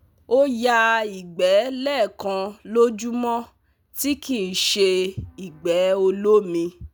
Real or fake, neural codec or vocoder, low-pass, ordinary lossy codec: real; none; none; none